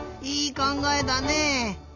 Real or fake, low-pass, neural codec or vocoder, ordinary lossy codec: real; 7.2 kHz; none; none